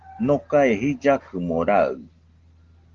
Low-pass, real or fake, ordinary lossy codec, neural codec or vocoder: 7.2 kHz; real; Opus, 16 kbps; none